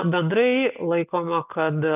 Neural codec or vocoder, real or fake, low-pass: none; real; 3.6 kHz